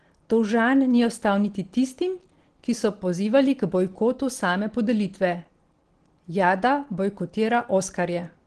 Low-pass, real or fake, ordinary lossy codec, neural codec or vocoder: 9.9 kHz; real; Opus, 16 kbps; none